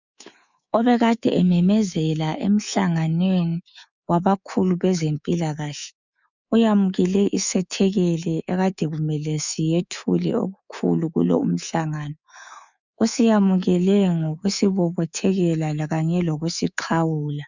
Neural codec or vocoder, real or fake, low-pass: codec, 24 kHz, 3.1 kbps, DualCodec; fake; 7.2 kHz